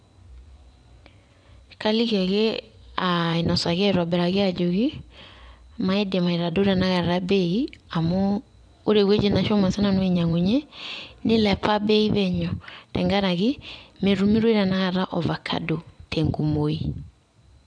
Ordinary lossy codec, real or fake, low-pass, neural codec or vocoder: none; fake; 9.9 kHz; vocoder, 24 kHz, 100 mel bands, Vocos